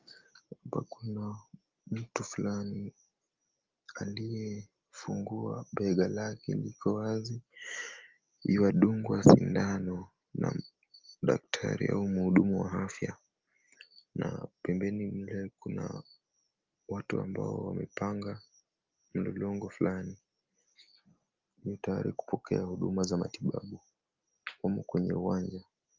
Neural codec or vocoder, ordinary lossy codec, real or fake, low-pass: none; Opus, 32 kbps; real; 7.2 kHz